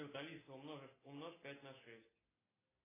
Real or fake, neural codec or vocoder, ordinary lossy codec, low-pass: real; none; AAC, 16 kbps; 3.6 kHz